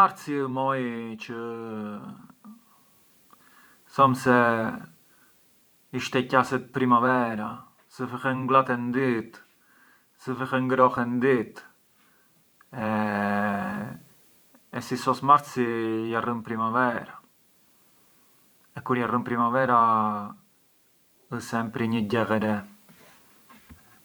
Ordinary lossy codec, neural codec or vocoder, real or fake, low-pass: none; vocoder, 44.1 kHz, 128 mel bands every 512 samples, BigVGAN v2; fake; none